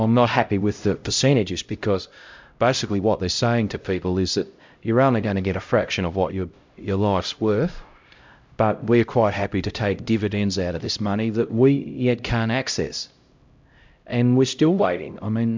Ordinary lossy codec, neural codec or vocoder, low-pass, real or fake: MP3, 64 kbps; codec, 16 kHz, 0.5 kbps, X-Codec, HuBERT features, trained on LibriSpeech; 7.2 kHz; fake